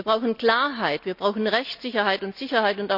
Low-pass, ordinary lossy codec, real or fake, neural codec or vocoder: 5.4 kHz; none; real; none